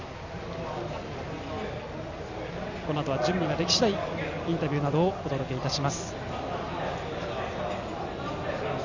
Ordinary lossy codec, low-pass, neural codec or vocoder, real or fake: none; 7.2 kHz; none; real